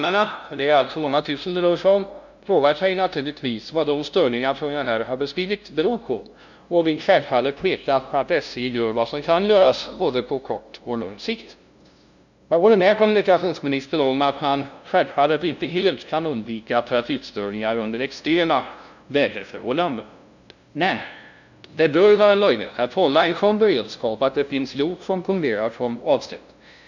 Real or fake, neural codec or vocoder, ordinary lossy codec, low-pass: fake; codec, 16 kHz, 0.5 kbps, FunCodec, trained on LibriTTS, 25 frames a second; none; 7.2 kHz